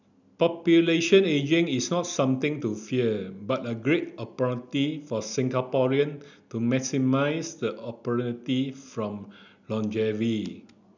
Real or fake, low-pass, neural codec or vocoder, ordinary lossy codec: real; 7.2 kHz; none; none